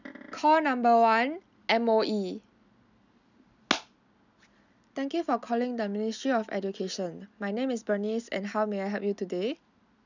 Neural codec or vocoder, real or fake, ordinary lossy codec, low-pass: none; real; none; 7.2 kHz